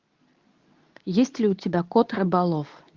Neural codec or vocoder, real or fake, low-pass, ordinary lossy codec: codec, 24 kHz, 0.9 kbps, WavTokenizer, medium speech release version 2; fake; 7.2 kHz; Opus, 24 kbps